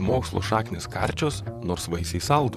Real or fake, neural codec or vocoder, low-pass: fake; vocoder, 44.1 kHz, 128 mel bands, Pupu-Vocoder; 14.4 kHz